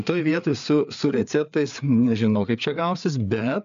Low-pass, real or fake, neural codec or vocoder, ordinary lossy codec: 7.2 kHz; fake; codec, 16 kHz, 4 kbps, FreqCodec, larger model; MP3, 64 kbps